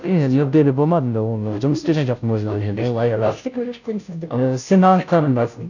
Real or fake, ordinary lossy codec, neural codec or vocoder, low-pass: fake; none; codec, 16 kHz, 0.5 kbps, FunCodec, trained on Chinese and English, 25 frames a second; 7.2 kHz